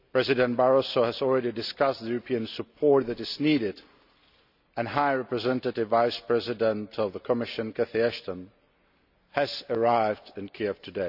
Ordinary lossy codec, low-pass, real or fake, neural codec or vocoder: none; 5.4 kHz; real; none